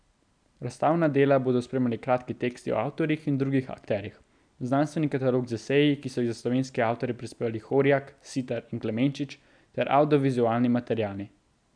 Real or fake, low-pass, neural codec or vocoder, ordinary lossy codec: real; 9.9 kHz; none; none